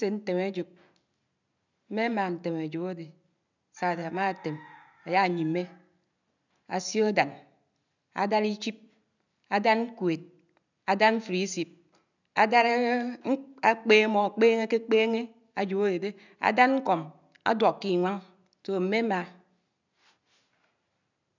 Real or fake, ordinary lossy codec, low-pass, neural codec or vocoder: real; none; 7.2 kHz; none